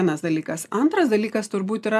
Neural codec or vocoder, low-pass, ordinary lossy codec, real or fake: none; 14.4 kHz; AAC, 96 kbps; real